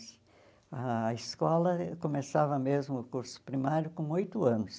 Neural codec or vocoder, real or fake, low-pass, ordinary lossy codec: none; real; none; none